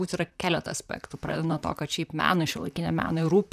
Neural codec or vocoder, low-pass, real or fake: vocoder, 44.1 kHz, 128 mel bands, Pupu-Vocoder; 14.4 kHz; fake